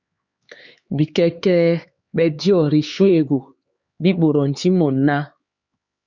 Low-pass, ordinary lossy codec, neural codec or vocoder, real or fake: 7.2 kHz; Opus, 64 kbps; codec, 16 kHz, 4 kbps, X-Codec, HuBERT features, trained on LibriSpeech; fake